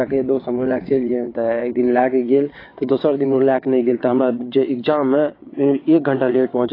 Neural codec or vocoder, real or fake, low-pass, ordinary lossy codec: vocoder, 22.05 kHz, 80 mel bands, WaveNeXt; fake; 5.4 kHz; AAC, 24 kbps